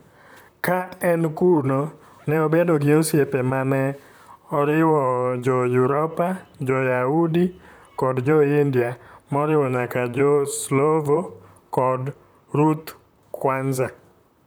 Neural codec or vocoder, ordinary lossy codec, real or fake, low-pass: vocoder, 44.1 kHz, 128 mel bands, Pupu-Vocoder; none; fake; none